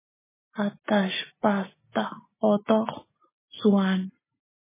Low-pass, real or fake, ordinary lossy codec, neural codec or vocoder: 3.6 kHz; real; MP3, 16 kbps; none